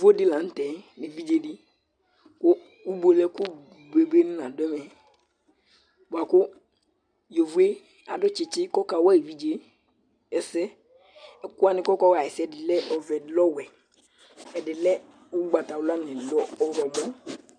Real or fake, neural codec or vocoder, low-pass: real; none; 9.9 kHz